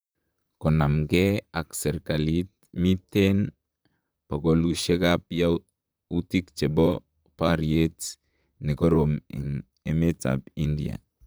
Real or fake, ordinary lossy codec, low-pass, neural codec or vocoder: fake; none; none; vocoder, 44.1 kHz, 128 mel bands, Pupu-Vocoder